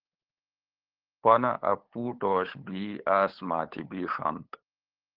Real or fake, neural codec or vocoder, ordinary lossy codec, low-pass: fake; codec, 16 kHz, 8 kbps, FunCodec, trained on LibriTTS, 25 frames a second; Opus, 16 kbps; 5.4 kHz